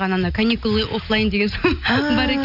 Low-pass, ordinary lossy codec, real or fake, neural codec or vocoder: 5.4 kHz; none; real; none